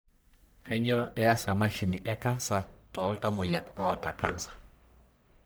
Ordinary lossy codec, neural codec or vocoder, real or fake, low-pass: none; codec, 44.1 kHz, 1.7 kbps, Pupu-Codec; fake; none